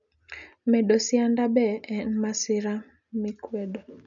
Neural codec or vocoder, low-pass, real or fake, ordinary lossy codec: none; 7.2 kHz; real; none